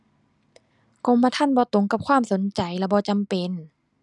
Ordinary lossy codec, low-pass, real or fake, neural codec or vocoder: none; 10.8 kHz; real; none